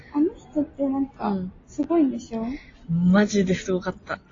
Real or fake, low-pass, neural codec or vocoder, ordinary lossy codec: real; 7.2 kHz; none; AAC, 32 kbps